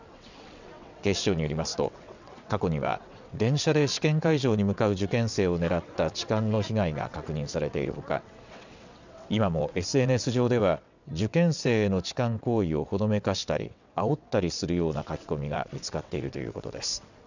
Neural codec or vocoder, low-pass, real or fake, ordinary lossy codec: vocoder, 22.05 kHz, 80 mel bands, WaveNeXt; 7.2 kHz; fake; none